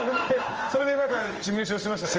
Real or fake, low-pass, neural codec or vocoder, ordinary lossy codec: fake; 7.2 kHz; codec, 16 kHz in and 24 kHz out, 1 kbps, XY-Tokenizer; Opus, 24 kbps